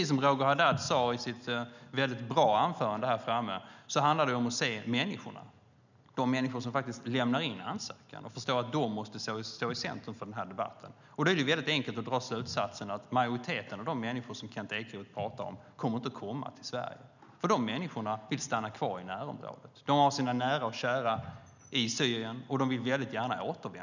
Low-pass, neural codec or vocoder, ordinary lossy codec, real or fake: 7.2 kHz; none; none; real